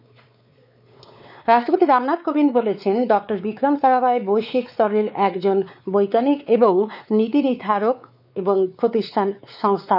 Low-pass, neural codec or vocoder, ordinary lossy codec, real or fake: 5.4 kHz; codec, 16 kHz, 4 kbps, X-Codec, WavLM features, trained on Multilingual LibriSpeech; none; fake